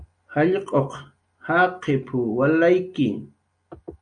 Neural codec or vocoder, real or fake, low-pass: none; real; 9.9 kHz